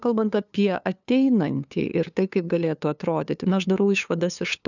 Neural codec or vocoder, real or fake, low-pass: codec, 16 kHz, 2 kbps, FunCodec, trained on LibriTTS, 25 frames a second; fake; 7.2 kHz